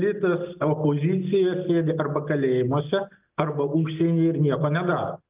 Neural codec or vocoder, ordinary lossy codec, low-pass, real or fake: none; Opus, 24 kbps; 3.6 kHz; real